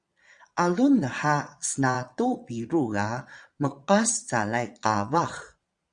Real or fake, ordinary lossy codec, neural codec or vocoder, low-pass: fake; Opus, 64 kbps; vocoder, 22.05 kHz, 80 mel bands, Vocos; 9.9 kHz